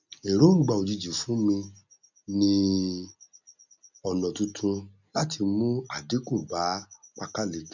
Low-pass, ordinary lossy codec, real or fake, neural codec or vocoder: 7.2 kHz; none; real; none